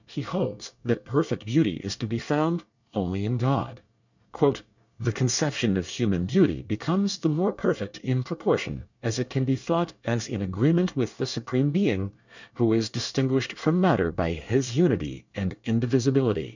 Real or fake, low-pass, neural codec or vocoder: fake; 7.2 kHz; codec, 24 kHz, 1 kbps, SNAC